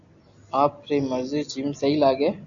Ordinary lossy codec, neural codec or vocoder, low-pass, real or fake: AAC, 64 kbps; none; 7.2 kHz; real